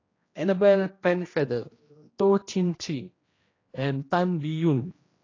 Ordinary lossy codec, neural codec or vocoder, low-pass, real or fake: AAC, 32 kbps; codec, 16 kHz, 1 kbps, X-Codec, HuBERT features, trained on general audio; 7.2 kHz; fake